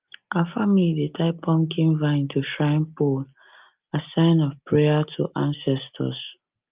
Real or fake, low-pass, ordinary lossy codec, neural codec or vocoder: real; 3.6 kHz; Opus, 24 kbps; none